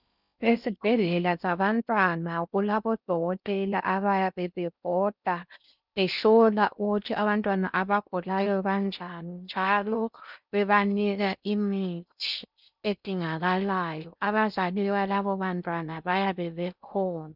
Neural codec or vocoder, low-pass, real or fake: codec, 16 kHz in and 24 kHz out, 0.6 kbps, FocalCodec, streaming, 4096 codes; 5.4 kHz; fake